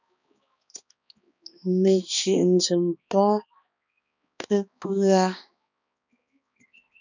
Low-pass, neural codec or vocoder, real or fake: 7.2 kHz; codec, 16 kHz, 2 kbps, X-Codec, HuBERT features, trained on balanced general audio; fake